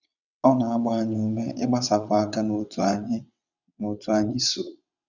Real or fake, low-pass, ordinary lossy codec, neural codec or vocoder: fake; 7.2 kHz; none; vocoder, 22.05 kHz, 80 mel bands, WaveNeXt